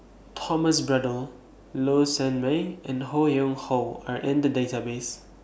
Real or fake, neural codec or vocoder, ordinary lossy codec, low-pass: real; none; none; none